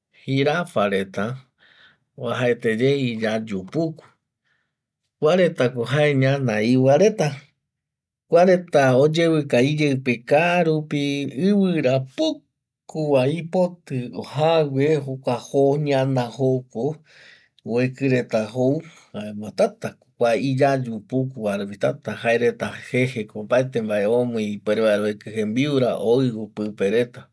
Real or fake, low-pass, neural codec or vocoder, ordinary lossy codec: real; none; none; none